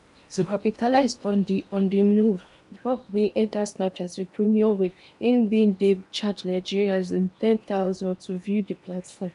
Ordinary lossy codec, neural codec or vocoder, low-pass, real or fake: none; codec, 16 kHz in and 24 kHz out, 0.8 kbps, FocalCodec, streaming, 65536 codes; 10.8 kHz; fake